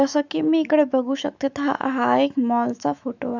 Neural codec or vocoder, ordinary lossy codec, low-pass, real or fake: autoencoder, 48 kHz, 128 numbers a frame, DAC-VAE, trained on Japanese speech; none; 7.2 kHz; fake